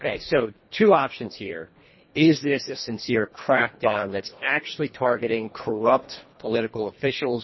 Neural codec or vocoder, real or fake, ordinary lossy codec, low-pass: codec, 24 kHz, 1.5 kbps, HILCodec; fake; MP3, 24 kbps; 7.2 kHz